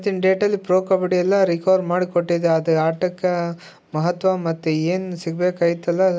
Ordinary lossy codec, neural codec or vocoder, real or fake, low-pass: none; none; real; none